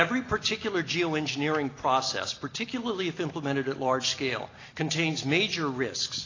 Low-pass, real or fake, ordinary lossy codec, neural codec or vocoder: 7.2 kHz; real; AAC, 32 kbps; none